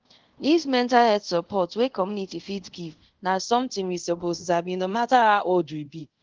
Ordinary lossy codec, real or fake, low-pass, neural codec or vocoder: Opus, 16 kbps; fake; 7.2 kHz; codec, 24 kHz, 0.5 kbps, DualCodec